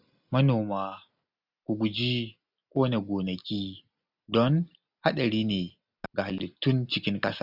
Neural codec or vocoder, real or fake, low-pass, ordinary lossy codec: none; real; 5.4 kHz; none